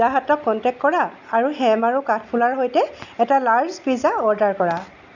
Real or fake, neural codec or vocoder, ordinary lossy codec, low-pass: real; none; none; 7.2 kHz